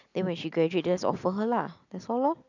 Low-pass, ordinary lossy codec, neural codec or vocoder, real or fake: 7.2 kHz; none; none; real